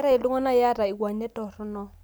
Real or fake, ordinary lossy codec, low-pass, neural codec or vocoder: real; none; none; none